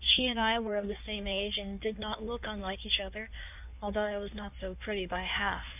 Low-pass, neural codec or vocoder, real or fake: 3.6 kHz; codec, 16 kHz in and 24 kHz out, 1.1 kbps, FireRedTTS-2 codec; fake